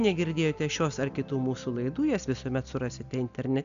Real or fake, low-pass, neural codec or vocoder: real; 7.2 kHz; none